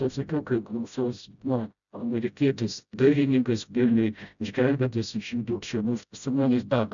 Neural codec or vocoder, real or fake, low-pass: codec, 16 kHz, 0.5 kbps, FreqCodec, smaller model; fake; 7.2 kHz